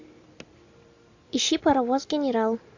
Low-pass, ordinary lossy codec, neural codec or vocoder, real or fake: 7.2 kHz; AAC, 48 kbps; none; real